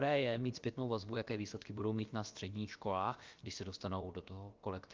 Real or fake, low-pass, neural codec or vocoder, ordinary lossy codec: fake; 7.2 kHz; codec, 16 kHz, about 1 kbps, DyCAST, with the encoder's durations; Opus, 24 kbps